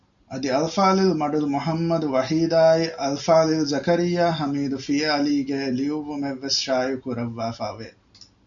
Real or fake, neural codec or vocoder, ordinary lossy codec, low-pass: real; none; MP3, 96 kbps; 7.2 kHz